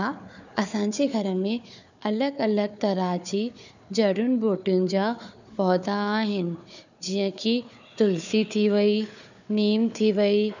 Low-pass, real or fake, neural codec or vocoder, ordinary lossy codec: 7.2 kHz; fake; codec, 16 kHz, 4 kbps, FunCodec, trained on Chinese and English, 50 frames a second; none